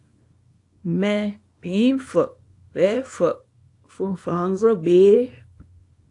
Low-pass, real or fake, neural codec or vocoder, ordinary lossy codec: 10.8 kHz; fake; codec, 24 kHz, 0.9 kbps, WavTokenizer, small release; AAC, 48 kbps